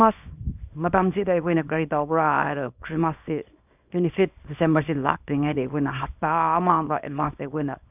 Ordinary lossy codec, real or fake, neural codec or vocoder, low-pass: none; fake; codec, 24 kHz, 0.9 kbps, WavTokenizer, small release; 3.6 kHz